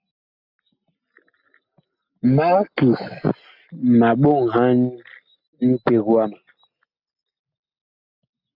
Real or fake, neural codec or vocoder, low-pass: real; none; 5.4 kHz